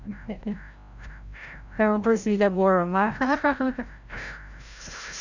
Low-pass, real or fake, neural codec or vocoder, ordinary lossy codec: 7.2 kHz; fake; codec, 16 kHz, 0.5 kbps, FreqCodec, larger model; none